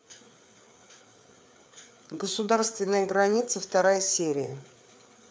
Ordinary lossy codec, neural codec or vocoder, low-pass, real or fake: none; codec, 16 kHz, 4 kbps, FreqCodec, larger model; none; fake